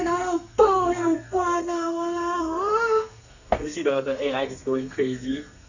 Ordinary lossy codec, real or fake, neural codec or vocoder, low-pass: none; fake; codec, 32 kHz, 1.9 kbps, SNAC; 7.2 kHz